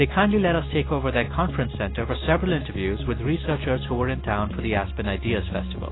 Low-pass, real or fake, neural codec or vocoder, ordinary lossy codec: 7.2 kHz; real; none; AAC, 16 kbps